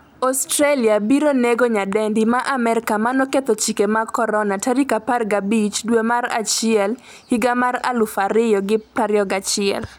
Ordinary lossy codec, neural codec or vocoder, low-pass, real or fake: none; none; none; real